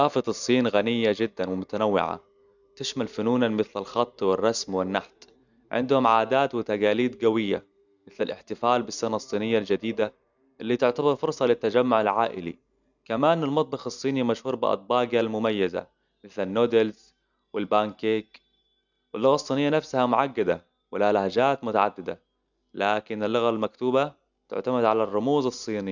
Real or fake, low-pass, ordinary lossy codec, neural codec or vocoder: real; 7.2 kHz; none; none